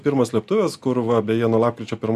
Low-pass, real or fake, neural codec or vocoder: 14.4 kHz; real; none